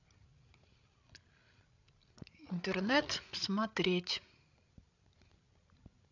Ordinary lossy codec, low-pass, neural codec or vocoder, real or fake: none; 7.2 kHz; codec, 16 kHz, 16 kbps, FreqCodec, larger model; fake